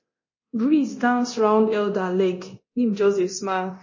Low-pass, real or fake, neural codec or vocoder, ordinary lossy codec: 7.2 kHz; fake; codec, 24 kHz, 0.9 kbps, DualCodec; MP3, 32 kbps